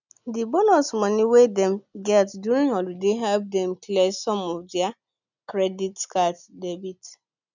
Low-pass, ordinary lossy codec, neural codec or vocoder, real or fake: 7.2 kHz; none; none; real